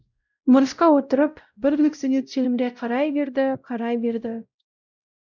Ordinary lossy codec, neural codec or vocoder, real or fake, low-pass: none; codec, 16 kHz, 0.5 kbps, X-Codec, WavLM features, trained on Multilingual LibriSpeech; fake; 7.2 kHz